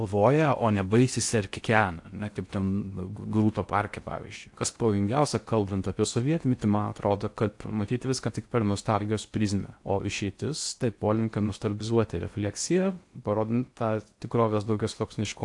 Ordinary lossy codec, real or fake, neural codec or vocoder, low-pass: AAC, 64 kbps; fake; codec, 16 kHz in and 24 kHz out, 0.6 kbps, FocalCodec, streaming, 4096 codes; 10.8 kHz